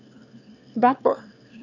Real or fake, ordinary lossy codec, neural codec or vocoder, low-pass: fake; none; autoencoder, 22.05 kHz, a latent of 192 numbers a frame, VITS, trained on one speaker; 7.2 kHz